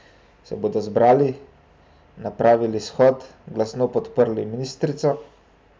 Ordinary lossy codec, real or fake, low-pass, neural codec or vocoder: none; real; none; none